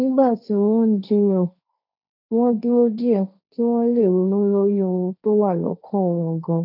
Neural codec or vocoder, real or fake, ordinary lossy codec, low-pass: codec, 16 kHz, 1.1 kbps, Voila-Tokenizer; fake; none; 5.4 kHz